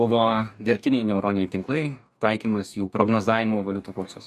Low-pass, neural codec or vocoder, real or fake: 14.4 kHz; codec, 44.1 kHz, 2.6 kbps, DAC; fake